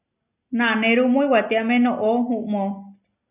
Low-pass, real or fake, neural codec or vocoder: 3.6 kHz; real; none